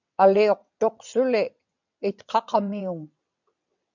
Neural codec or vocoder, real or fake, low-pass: vocoder, 22.05 kHz, 80 mel bands, WaveNeXt; fake; 7.2 kHz